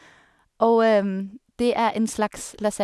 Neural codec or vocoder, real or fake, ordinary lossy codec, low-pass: none; real; none; none